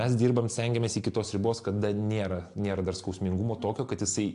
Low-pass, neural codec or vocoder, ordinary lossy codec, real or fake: 10.8 kHz; none; AAC, 64 kbps; real